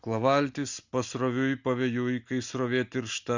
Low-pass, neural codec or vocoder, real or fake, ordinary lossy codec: 7.2 kHz; none; real; Opus, 64 kbps